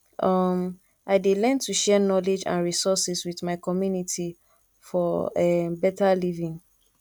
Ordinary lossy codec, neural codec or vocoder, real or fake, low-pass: none; none; real; 19.8 kHz